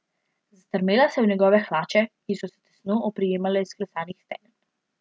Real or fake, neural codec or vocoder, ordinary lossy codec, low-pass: real; none; none; none